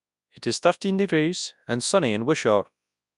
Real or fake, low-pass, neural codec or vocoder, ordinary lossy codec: fake; 10.8 kHz; codec, 24 kHz, 0.9 kbps, WavTokenizer, large speech release; none